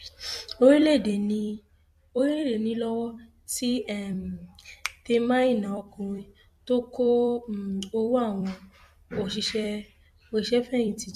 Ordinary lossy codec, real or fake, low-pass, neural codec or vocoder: MP3, 64 kbps; fake; 14.4 kHz; vocoder, 44.1 kHz, 128 mel bands every 256 samples, BigVGAN v2